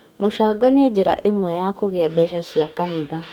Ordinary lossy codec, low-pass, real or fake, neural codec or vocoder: none; 19.8 kHz; fake; codec, 44.1 kHz, 2.6 kbps, DAC